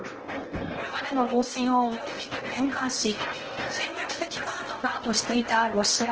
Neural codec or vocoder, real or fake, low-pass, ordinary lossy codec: codec, 16 kHz in and 24 kHz out, 0.8 kbps, FocalCodec, streaming, 65536 codes; fake; 7.2 kHz; Opus, 16 kbps